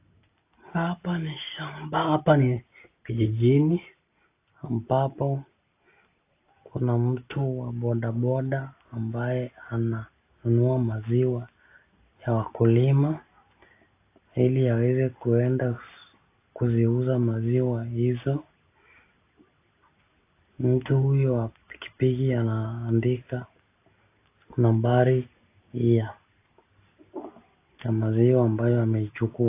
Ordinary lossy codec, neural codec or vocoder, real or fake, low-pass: AAC, 24 kbps; none; real; 3.6 kHz